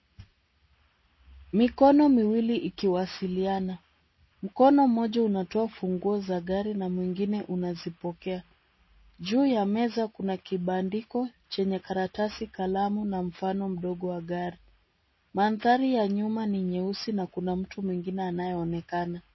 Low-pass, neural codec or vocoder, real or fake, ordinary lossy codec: 7.2 kHz; none; real; MP3, 24 kbps